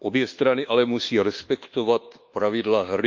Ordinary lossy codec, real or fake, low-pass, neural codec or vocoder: Opus, 32 kbps; fake; 7.2 kHz; codec, 24 kHz, 1.2 kbps, DualCodec